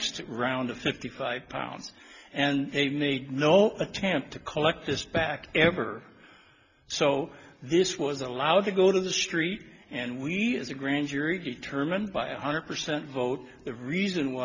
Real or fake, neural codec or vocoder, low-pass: real; none; 7.2 kHz